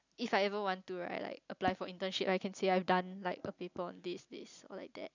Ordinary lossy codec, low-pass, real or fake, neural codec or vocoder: none; 7.2 kHz; real; none